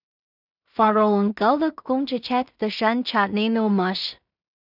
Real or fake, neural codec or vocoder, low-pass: fake; codec, 16 kHz in and 24 kHz out, 0.4 kbps, LongCat-Audio-Codec, two codebook decoder; 5.4 kHz